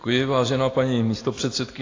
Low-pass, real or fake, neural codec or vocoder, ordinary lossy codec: 7.2 kHz; real; none; AAC, 32 kbps